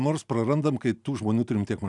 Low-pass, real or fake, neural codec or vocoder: 10.8 kHz; real; none